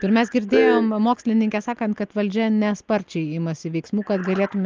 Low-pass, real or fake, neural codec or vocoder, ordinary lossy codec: 7.2 kHz; real; none; Opus, 24 kbps